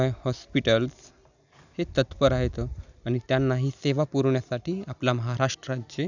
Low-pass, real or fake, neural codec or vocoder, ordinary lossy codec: 7.2 kHz; real; none; none